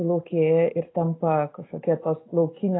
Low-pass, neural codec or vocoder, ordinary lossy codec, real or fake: 7.2 kHz; none; AAC, 16 kbps; real